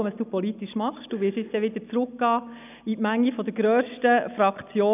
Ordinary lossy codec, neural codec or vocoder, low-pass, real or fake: none; none; 3.6 kHz; real